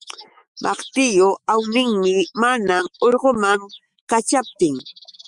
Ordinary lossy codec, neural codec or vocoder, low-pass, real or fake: Opus, 64 kbps; autoencoder, 48 kHz, 128 numbers a frame, DAC-VAE, trained on Japanese speech; 10.8 kHz; fake